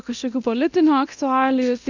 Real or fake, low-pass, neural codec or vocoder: fake; 7.2 kHz; codec, 24 kHz, 0.9 kbps, DualCodec